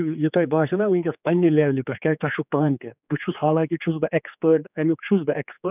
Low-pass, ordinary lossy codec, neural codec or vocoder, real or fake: 3.6 kHz; none; codec, 16 kHz, 4 kbps, X-Codec, HuBERT features, trained on general audio; fake